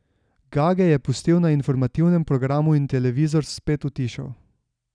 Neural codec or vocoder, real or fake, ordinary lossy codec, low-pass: none; real; none; 9.9 kHz